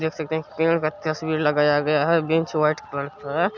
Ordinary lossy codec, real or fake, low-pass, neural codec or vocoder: none; real; 7.2 kHz; none